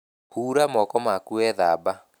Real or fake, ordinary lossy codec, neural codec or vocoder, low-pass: real; none; none; none